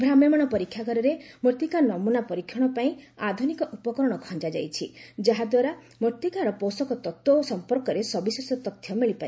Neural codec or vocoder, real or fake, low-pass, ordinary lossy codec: none; real; none; none